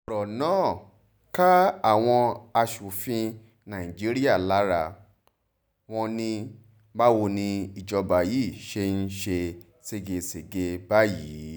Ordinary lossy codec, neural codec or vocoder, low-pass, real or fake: none; none; none; real